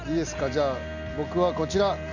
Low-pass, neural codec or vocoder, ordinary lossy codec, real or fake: 7.2 kHz; none; none; real